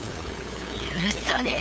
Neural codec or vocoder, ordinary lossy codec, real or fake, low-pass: codec, 16 kHz, 8 kbps, FunCodec, trained on LibriTTS, 25 frames a second; none; fake; none